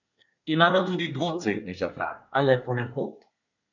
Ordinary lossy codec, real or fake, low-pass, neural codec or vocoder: none; fake; 7.2 kHz; codec, 24 kHz, 1 kbps, SNAC